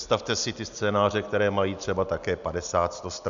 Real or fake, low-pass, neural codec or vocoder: real; 7.2 kHz; none